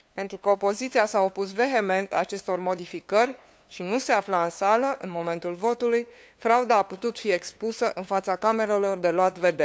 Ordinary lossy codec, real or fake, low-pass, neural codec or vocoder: none; fake; none; codec, 16 kHz, 2 kbps, FunCodec, trained on LibriTTS, 25 frames a second